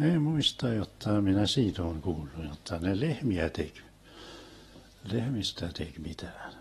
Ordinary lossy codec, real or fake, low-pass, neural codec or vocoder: AAC, 32 kbps; real; 19.8 kHz; none